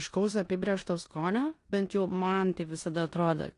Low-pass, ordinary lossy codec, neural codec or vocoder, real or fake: 10.8 kHz; AAC, 48 kbps; codec, 16 kHz in and 24 kHz out, 0.9 kbps, LongCat-Audio-Codec, four codebook decoder; fake